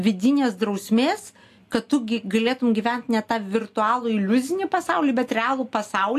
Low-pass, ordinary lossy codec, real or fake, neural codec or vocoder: 14.4 kHz; AAC, 64 kbps; real; none